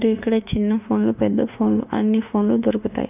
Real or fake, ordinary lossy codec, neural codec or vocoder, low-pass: fake; none; autoencoder, 48 kHz, 32 numbers a frame, DAC-VAE, trained on Japanese speech; 3.6 kHz